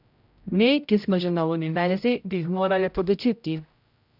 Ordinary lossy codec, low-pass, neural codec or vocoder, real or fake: none; 5.4 kHz; codec, 16 kHz, 0.5 kbps, X-Codec, HuBERT features, trained on general audio; fake